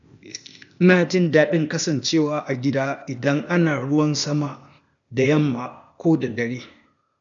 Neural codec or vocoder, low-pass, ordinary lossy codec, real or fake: codec, 16 kHz, 0.8 kbps, ZipCodec; 7.2 kHz; none; fake